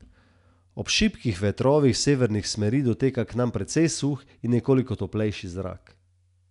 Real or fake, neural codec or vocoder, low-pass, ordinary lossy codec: real; none; 10.8 kHz; none